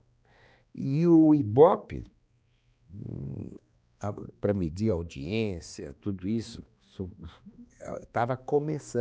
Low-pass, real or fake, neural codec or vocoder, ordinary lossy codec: none; fake; codec, 16 kHz, 2 kbps, X-Codec, HuBERT features, trained on balanced general audio; none